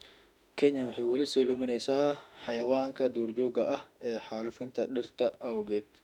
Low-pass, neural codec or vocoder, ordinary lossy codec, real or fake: 19.8 kHz; autoencoder, 48 kHz, 32 numbers a frame, DAC-VAE, trained on Japanese speech; none; fake